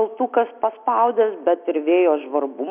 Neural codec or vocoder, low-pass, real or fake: none; 3.6 kHz; real